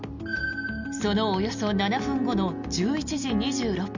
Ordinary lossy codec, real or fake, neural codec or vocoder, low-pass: none; real; none; 7.2 kHz